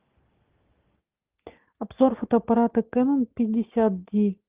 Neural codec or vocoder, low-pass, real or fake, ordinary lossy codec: none; 3.6 kHz; real; Opus, 32 kbps